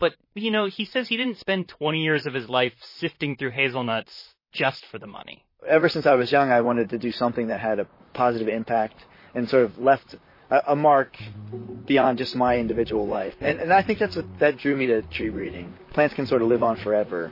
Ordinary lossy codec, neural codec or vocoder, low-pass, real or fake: MP3, 24 kbps; vocoder, 44.1 kHz, 128 mel bands, Pupu-Vocoder; 5.4 kHz; fake